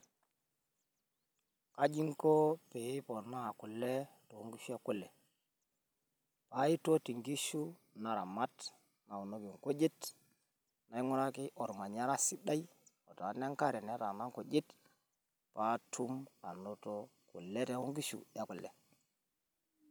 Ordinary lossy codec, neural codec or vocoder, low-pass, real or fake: none; none; none; real